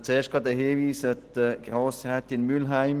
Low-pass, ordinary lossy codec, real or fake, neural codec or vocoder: 14.4 kHz; Opus, 16 kbps; real; none